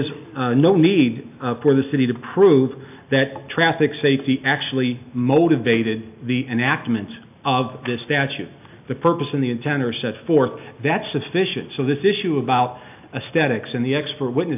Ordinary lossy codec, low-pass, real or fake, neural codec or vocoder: AAC, 32 kbps; 3.6 kHz; real; none